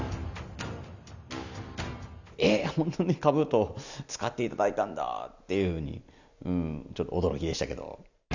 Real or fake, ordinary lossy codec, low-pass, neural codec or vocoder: real; none; 7.2 kHz; none